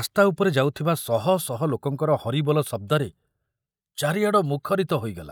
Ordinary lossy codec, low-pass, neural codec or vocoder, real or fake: none; none; none; real